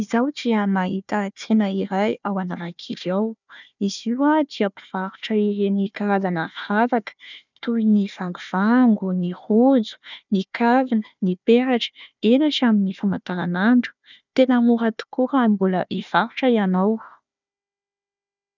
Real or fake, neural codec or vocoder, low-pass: fake; codec, 16 kHz, 1 kbps, FunCodec, trained on Chinese and English, 50 frames a second; 7.2 kHz